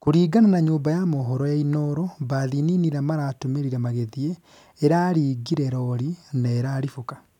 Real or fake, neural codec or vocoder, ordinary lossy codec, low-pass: real; none; none; 19.8 kHz